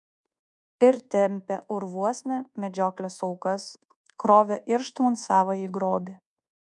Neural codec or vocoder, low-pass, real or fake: codec, 24 kHz, 1.2 kbps, DualCodec; 10.8 kHz; fake